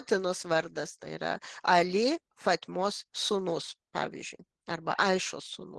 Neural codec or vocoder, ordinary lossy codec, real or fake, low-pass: none; Opus, 16 kbps; real; 10.8 kHz